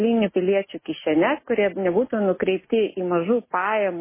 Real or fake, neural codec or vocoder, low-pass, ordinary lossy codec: real; none; 3.6 kHz; MP3, 16 kbps